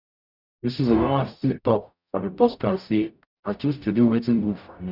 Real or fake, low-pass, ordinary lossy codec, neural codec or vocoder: fake; 5.4 kHz; none; codec, 44.1 kHz, 0.9 kbps, DAC